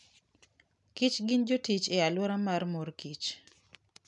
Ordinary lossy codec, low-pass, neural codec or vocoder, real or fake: none; 10.8 kHz; none; real